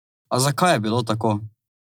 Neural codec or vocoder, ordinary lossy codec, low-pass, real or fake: none; none; none; real